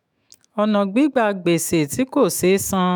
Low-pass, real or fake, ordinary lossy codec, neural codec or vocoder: none; fake; none; autoencoder, 48 kHz, 128 numbers a frame, DAC-VAE, trained on Japanese speech